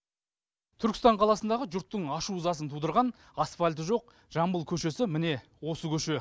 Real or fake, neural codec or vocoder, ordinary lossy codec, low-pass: real; none; none; none